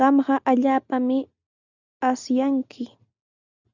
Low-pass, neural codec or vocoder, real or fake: 7.2 kHz; none; real